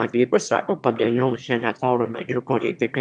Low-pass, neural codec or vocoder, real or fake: 9.9 kHz; autoencoder, 22.05 kHz, a latent of 192 numbers a frame, VITS, trained on one speaker; fake